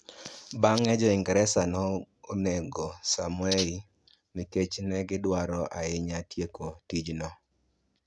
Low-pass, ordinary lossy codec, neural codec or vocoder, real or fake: none; none; none; real